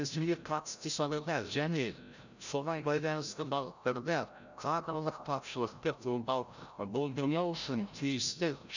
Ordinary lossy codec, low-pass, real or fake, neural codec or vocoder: none; 7.2 kHz; fake; codec, 16 kHz, 0.5 kbps, FreqCodec, larger model